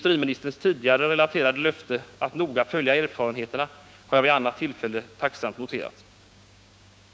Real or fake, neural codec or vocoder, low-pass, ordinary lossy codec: fake; codec, 16 kHz, 6 kbps, DAC; none; none